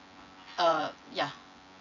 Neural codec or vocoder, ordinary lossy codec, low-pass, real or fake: vocoder, 24 kHz, 100 mel bands, Vocos; none; 7.2 kHz; fake